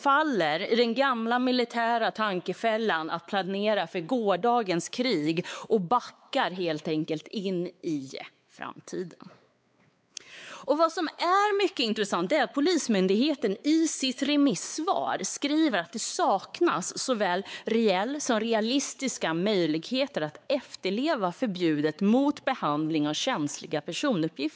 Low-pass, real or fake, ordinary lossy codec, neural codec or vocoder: none; fake; none; codec, 16 kHz, 4 kbps, X-Codec, WavLM features, trained on Multilingual LibriSpeech